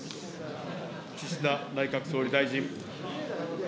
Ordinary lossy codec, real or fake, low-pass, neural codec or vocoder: none; real; none; none